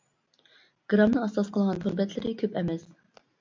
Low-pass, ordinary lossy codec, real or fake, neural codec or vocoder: 7.2 kHz; MP3, 48 kbps; real; none